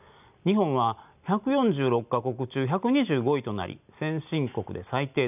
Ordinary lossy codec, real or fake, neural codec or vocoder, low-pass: none; real; none; 3.6 kHz